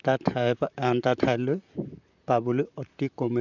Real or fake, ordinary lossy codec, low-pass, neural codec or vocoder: fake; none; 7.2 kHz; vocoder, 44.1 kHz, 128 mel bands, Pupu-Vocoder